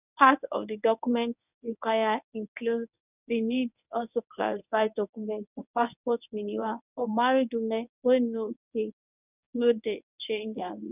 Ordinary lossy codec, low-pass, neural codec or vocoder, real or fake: none; 3.6 kHz; codec, 24 kHz, 0.9 kbps, WavTokenizer, medium speech release version 1; fake